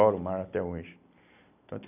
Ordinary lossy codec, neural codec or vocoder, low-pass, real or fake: none; none; 3.6 kHz; real